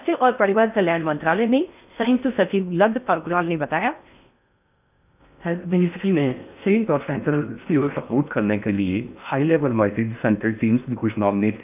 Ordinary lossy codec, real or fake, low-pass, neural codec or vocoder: none; fake; 3.6 kHz; codec, 16 kHz in and 24 kHz out, 0.6 kbps, FocalCodec, streaming, 4096 codes